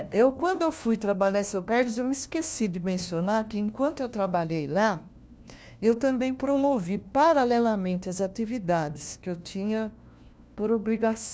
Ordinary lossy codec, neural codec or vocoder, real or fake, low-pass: none; codec, 16 kHz, 1 kbps, FunCodec, trained on LibriTTS, 50 frames a second; fake; none